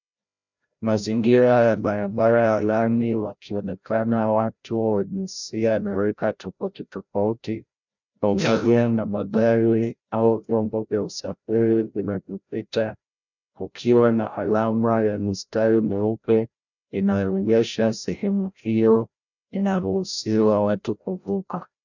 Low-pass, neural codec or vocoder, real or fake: 7.2 kHz; codec, 16 kHz, 0.5 kbps, FreqCodec, larger model; fake